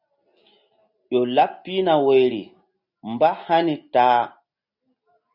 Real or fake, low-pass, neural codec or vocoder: real; 5.4 kHz; none